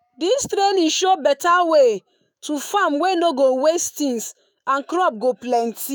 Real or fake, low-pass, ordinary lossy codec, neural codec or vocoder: fake; none; none; autoencoder, 48 kHz, 128 numbers a frame, DAC-VAE, trained on Japanese speech